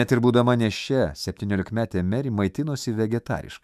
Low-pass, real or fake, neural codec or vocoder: 14.4 kHz; fake; autoencoder, 48 kHz, 128 numbers a frame, DAC-VAE, trained on Japanese speech